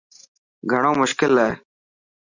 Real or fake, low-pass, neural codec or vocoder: real; 7.2 kHz; none